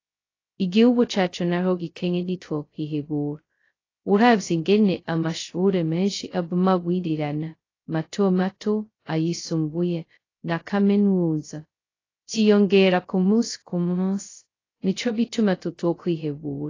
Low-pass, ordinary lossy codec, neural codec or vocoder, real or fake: 7.2 kHz; AAC, 32 kbps; codec, 16 kHz, 0.2 kbps, FocalCodec; fake